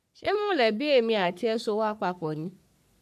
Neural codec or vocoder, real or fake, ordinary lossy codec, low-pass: codec, 44.1 kHz, 3.4 kbps, Pupu-Codec; fake; none; 14.4 kHz